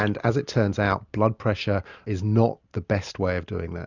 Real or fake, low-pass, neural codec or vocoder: real; 7.2 kHz; none